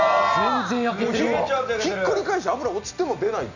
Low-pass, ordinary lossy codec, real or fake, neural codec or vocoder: 7.2 kHz; none; real; none